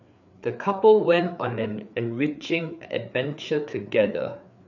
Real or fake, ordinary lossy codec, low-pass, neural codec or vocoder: fake; none; 7.2 kHz; codec, 16 kHz, 4 kbps, FreqCodec, larger model